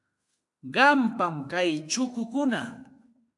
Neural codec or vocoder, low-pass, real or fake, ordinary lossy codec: autoencoder, 48 kHz, 32 numbers a frame, DAC-VAE, trained on Japanese speech; 10.8 kHz; fake; AAC, 64 kbps